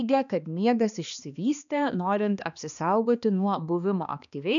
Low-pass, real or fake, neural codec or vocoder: 7.2 kHz; fake; codec, 16 kHz, 2 kbps, X-Codec, HuBERT features, trained on balanced general audio